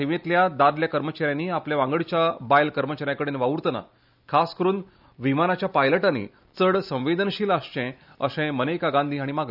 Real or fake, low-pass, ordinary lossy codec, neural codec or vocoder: real; 5.4 kHz; none; none